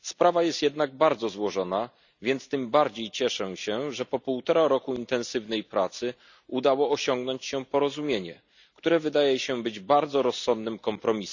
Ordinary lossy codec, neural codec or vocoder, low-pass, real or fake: none; none; 7.2 kHz; real